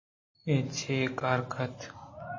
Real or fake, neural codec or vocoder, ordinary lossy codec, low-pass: real; none; MP3, 32 kbps; 7.2 kHz